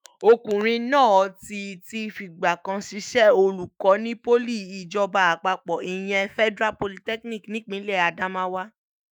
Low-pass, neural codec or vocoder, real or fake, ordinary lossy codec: none; autoencoder, 48 kHz, 128 numbers a frame, DAC-VAE, trained on Japanese speech; fake; none